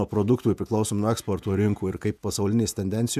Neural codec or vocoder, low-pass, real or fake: vocoder, 48 kHz, 128 mel bands, Vocos; 14.4 kHz; fake